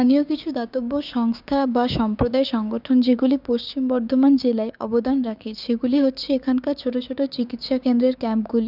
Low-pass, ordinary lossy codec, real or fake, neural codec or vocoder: 5.4 kHz; none; fake; codec, 16 kHz, 6 kbps, DAC